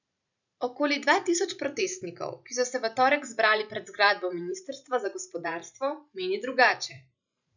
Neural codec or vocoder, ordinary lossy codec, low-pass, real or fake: vocoder, 44.1 kHz, 128 mel bands every 512 samples, BigVGAN v2; none; 7.2 kHz; fake